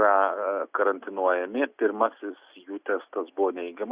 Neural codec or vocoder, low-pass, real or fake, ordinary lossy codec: none; 3.6 kHz; real; Opus, 64 kbps